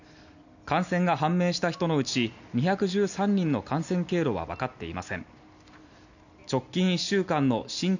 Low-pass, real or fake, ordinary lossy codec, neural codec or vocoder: 7.2 kHz; real; none; none